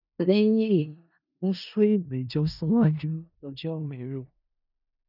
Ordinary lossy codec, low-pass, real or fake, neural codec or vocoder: none; 5.4 kHz; fake; codec, 16 kHz in and 24 kHz out, 0.4 kbps, LongCat-Audio-Codec, four codebook decoder